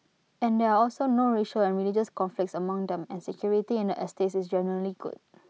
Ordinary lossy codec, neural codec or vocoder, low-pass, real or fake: none; none; none; real